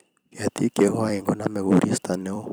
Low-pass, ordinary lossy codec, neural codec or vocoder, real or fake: none; none; vocoder, 44.1 kHz, 128 mel bands every 256 samples, BigVGAN v2; fake